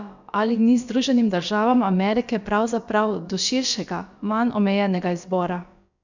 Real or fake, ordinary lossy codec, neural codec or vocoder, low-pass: fake; none; codec, 16 kHz, about 1 kbps, DyCAST, with the encoder's durations; 7.2 kHz